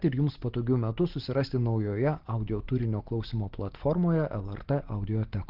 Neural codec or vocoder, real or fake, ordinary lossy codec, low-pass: none; real; Opus, 16 kbps; 5.4 kHz